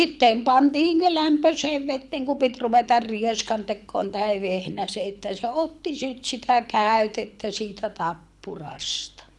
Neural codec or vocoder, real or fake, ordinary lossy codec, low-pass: codec, 24 kHz, 6 kbps, HILCodec; fake; none; none